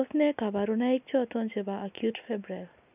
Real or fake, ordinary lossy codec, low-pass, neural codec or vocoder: real; none; 3.6 kHz; none